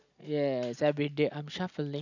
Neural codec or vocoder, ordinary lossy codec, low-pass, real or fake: none; none; 7.2 kHz; real